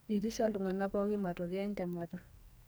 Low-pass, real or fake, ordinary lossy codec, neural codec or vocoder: none; fake; none; codec, 44.1 kHz, 2.6 kbps, SNAC